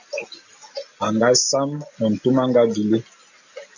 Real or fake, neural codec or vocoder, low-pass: real; none; 7.2 kHz